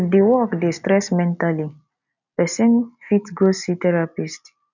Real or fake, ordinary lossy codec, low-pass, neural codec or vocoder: real; none; 7.2 kHz; none